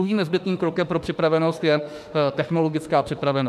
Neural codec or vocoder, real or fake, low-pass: autoencoder, 48 kHz, 32 numbers a frame, DAC-VAE, trained on Japanese speech; fake; 14.4 kHz